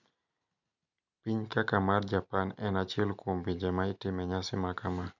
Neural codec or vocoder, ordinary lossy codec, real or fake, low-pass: none; none; real; 7.2 kHz